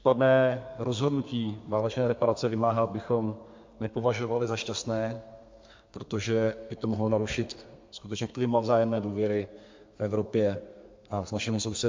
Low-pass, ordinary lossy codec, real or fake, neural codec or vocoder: 7.2 kHz; MP3, 48 kbps; fake; codec, 32 kHz, 1.9 kbps, SNAC